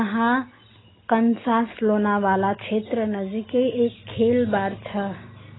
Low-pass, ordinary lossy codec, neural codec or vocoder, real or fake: 7.2 kHz; AAC, 16 kbps; none; real